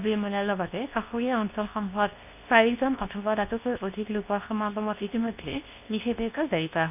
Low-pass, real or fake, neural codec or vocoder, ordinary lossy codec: 3.6 kHz; fake; codec, 24 kHz, 0.9 kbps, WavTokenizer, medium speech release version 2; none